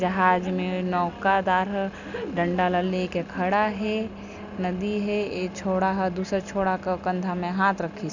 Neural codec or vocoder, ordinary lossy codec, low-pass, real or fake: none; none; 7.2 kHz; real